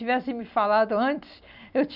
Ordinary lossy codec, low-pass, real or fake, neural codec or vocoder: none; 5.4 kHz; real; none